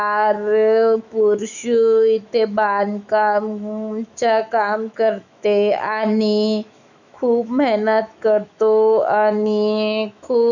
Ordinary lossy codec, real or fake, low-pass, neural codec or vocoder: none; fake; 7.2 kHz; autoencoder, 48 kHz, 128 numbers a frame, DAC-VAE, trained on Japanese speech